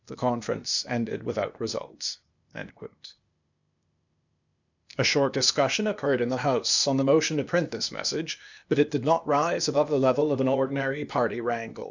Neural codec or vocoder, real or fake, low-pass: codec, 16 kHz, 0.8 kbps, ZipCodec; fake; 7.2 kHz